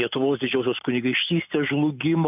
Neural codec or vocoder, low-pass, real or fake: none; 3.6 kHz; real